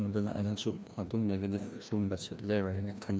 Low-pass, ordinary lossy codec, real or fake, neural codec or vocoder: none; none; fake; codec, 16 kHz, 1 kbps, FreqCodec, larger model